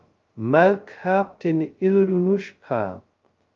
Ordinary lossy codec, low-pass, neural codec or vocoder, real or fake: Opus, 32 kbps; 7.2 kHz; codec, 16 kHz, 0.2 kbps, FocalCodec; fake